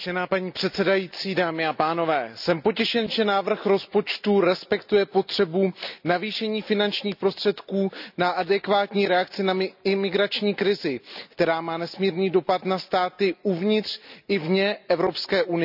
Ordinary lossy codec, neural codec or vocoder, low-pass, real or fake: none; none; 5.4 kHz; real